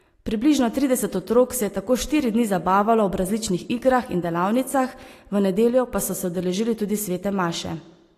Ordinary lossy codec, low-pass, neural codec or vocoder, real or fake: AAC, 48 kbps; 14.4 kHz; none; real